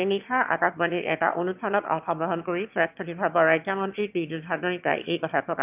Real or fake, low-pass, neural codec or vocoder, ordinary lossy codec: fake; 3.6 kHz; autoencoder, 22.05 kHz, a latent of 192 numbers a frame, VITS, trained on one speaker; none